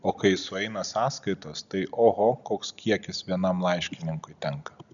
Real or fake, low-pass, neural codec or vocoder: real; 7.2 kHz; none